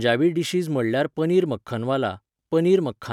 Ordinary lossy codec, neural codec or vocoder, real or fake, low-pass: none; none; real; 19.8 kHz